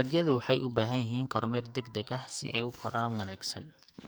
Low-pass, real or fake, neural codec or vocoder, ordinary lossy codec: none; fake; codec, 44.1 kHz, 2.6 kbps, SNAC; none